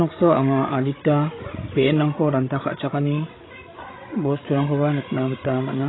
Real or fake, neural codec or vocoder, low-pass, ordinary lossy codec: fake; codec, 16 kHz, 16 kbps, FreqCodec, larger model; 7.2 kHz; AAC, 16 kbps